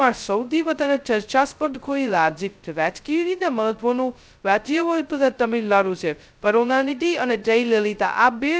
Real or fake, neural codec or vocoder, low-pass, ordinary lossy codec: fake; codec, 16 kHz, 0.2 kbps, FocalCodec; none; none